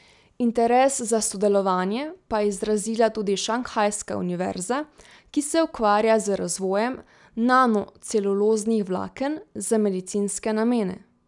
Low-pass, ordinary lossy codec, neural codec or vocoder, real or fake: 10.8 kHz; none; none; real